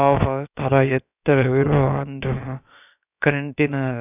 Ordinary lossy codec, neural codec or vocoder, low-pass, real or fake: none; codec, 16 kHz, about 1 kbps, DyCAST, with the encoder's durations; 3.6 kHz; fake